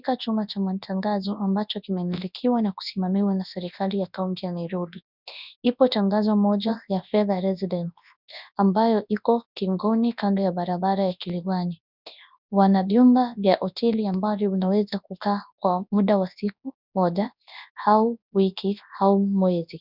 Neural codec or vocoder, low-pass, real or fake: codec, 24 kHz, 0.9 kbps, WavTokenizer, large speech release; 5.4 kHz; fake